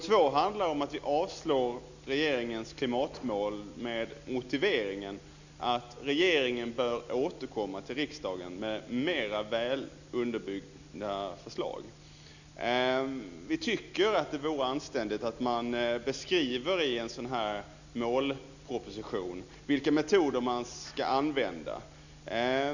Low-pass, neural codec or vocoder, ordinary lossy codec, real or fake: 7.2 kHz; none; none; real